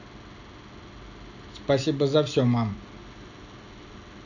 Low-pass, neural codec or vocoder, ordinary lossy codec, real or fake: 7.2 kHz; none; none; real